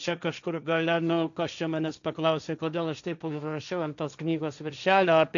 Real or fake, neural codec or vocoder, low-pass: fake; codec, 16 kHz, 1.1 kbps, Voila-Tokenizer; 7.2 kHz